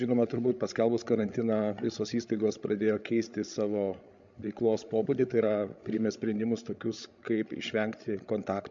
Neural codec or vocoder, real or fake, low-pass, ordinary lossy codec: codec, 16 kHz, 16 kbps, FreqCodec, larger model; fake; 7.2 kHz; MP3, 64 kbps